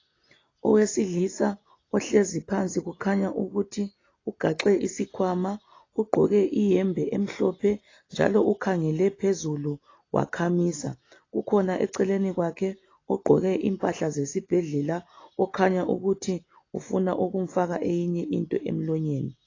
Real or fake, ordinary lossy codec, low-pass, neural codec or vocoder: real; AAC, 32 kbps; 7.2 kHz; none